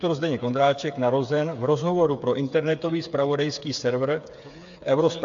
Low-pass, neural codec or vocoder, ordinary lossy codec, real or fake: 7.2 kHz; codec, 16 kHz, 8 kbps, FreqCodec, smaller model; AAC, 64 kbps; fake